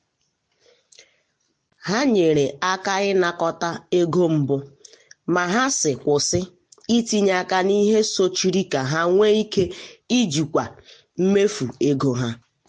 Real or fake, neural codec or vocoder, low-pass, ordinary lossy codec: real; none; 9.9 kHz; MP3, 48 kbps